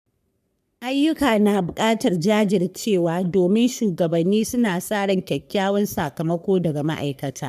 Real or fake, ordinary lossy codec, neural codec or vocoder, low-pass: fake; none; codec, 44.1 kHz, 3.4 kbps, Pupu-Codec; 14.4 kHz